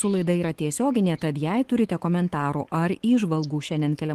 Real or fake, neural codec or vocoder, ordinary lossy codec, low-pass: fake; autoencoder, 48 kHz, 128 numbers a frame, DAC-VAE, trained on Japanese speech; Opus, 16 kbps; 14.4 kHz